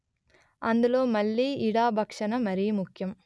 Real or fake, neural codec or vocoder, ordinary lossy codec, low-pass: real; none; none; none